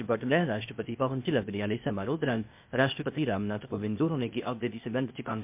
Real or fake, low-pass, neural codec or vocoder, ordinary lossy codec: fake; 3.6 kHz; codec, 16 kHz, 0.8 kbps, ZipCodec; MP3, 32 kbps